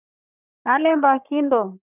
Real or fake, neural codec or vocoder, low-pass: fake; codec, 44.1 kHz, 3.4 kbps, Pupu-Codec; 3.6 kHz